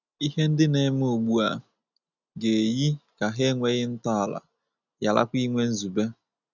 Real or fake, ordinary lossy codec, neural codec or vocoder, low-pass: real; none; none; 7.2 kHz